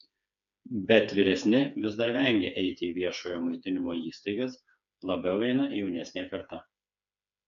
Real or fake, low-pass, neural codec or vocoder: fake; 7.2 kHz; codec, 16 kHz, 4 kbps, FreqCodec, smaller model